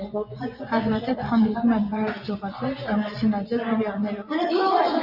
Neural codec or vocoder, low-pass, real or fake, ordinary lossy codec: vocoder, 24 kHz, 100 mel bands, Vocos; 5.4 kHz; fake; Opus, 64 kbps